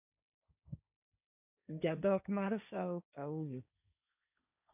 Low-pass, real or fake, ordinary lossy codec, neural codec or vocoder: 3.6 kHz; fake; none; codec, 16 kHz, 1.1 kbps, Voila-Tokenizer